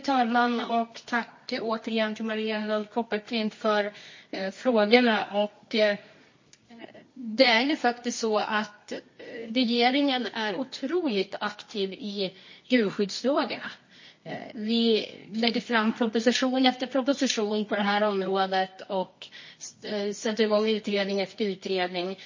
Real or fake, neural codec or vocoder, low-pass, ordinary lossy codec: fake; codec, 24 kHz, 0.9 kbps, WavTokenizer, medium music audio release; 7.2 kHz; MP3, 32 kbps